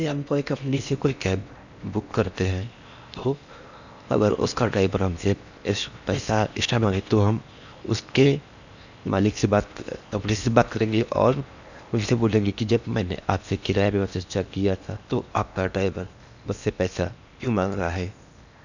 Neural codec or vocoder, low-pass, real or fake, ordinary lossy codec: codec, 16 kHz in and 24 kHz out, 0.8 kbps, FocalCodec, streaming, 65536 codes; 7.2 kHz; fake; none